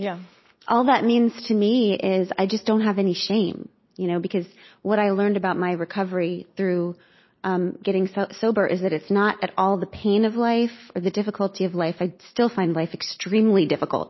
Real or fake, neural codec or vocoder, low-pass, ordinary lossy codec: real; none; 7.2 kHz; MP3, 24 kbps